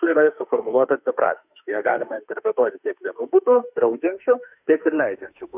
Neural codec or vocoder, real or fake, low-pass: autoencoder, 48 kHz, 32 numbers a frame, DAC-VAE, trained on Japanese speech; fake; 3.6 kHz